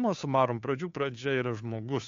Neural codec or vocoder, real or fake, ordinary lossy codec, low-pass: codec, 16 kHz, 2 kbps, FunCodec, trained on Chinese and English, 25 frames a second; fake; MP3, 64 kbps; 7.2 kHz